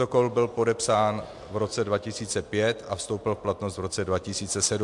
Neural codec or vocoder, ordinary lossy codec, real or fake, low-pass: none; MP3, 64 kbps; real; 10.8 kHz